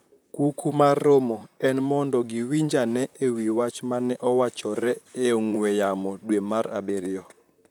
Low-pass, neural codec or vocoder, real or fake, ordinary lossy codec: none; vocoder, 44.1 kHz, 128 mel bands, Pupu-Vocoder; fake; none